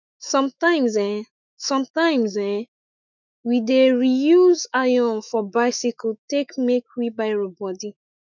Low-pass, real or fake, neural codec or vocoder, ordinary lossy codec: 7.2 kHz; fake; autoencoder, 48 kHz, 128 numbers a frame, DAC-VAE, trained on Japanese speech; none